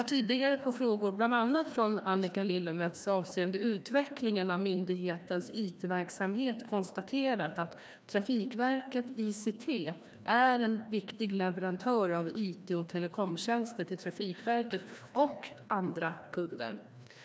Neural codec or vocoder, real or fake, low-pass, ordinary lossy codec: codec, 16 kHz, 1 kbps, FreqCodec, larger model; fake; none; none